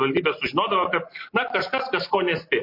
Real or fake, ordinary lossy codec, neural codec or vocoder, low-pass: real; MP3, 32 kbps; none; 5.4 kHz